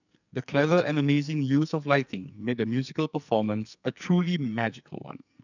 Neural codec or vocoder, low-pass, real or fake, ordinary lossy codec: codec, 44.1 kHz, 2.6 kbps, SNAC; 7.2 kHz; fake; none